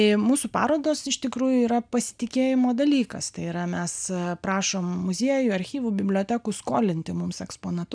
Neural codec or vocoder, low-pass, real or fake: none; 9.9 kHz; real